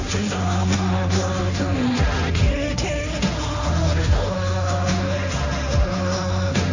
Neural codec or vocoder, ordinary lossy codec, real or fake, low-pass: codec, 16 kHz, 1.1 kbps, Voila-Tokenizer; none; fake; 7.2 kHz